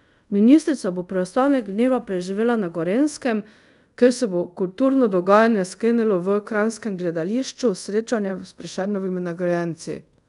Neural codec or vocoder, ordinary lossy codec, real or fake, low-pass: codec, 24 kHz, 0.5 kbps, DualCodec; none; fake; 10.8 kHz